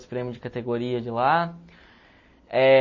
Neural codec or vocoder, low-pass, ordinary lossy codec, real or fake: none; 7.2 kHz; MP3, 32 kbps; real